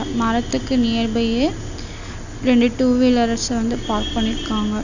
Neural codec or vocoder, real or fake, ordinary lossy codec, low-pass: none; real; none; 7.2 kHz